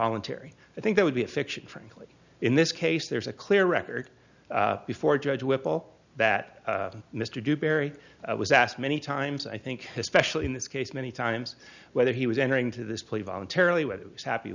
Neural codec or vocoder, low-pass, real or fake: none; 7.2 kHz; real